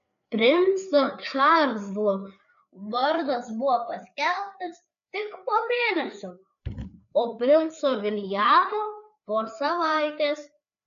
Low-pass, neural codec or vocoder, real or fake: 7.2 kHz; codec, 16 kHz, 4 kbps, FreqCodec, larger model; fake